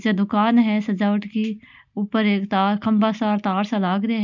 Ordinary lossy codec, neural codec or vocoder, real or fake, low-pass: none; none; real; 7.2 kHz